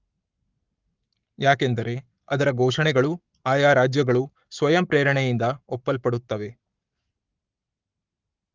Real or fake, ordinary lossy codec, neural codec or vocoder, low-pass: fake; Opus, 24 kbps; codec, 44.1 kHz, 7.8 kbps, Pupu-Codec; 7.2 kHz